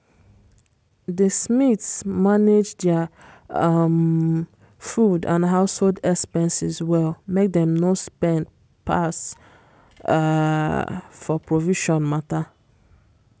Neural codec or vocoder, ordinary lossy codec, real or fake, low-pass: none; none; real; none